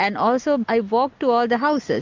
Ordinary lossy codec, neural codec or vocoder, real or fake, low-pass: MP3, 64 kbps; none; real; 7.2 kHz